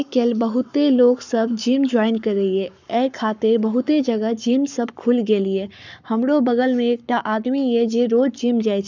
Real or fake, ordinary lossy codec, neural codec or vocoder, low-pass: fake; none; codec, 44.1 kHz, 7.8 kbps, Pupu-Codec; 7.2 kHz